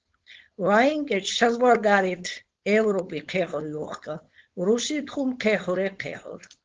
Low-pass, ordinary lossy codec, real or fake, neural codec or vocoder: 7.2 kHz; Opus, 16 kbps; fake; codec, 16 kHz, 4.8 kbps, FACodec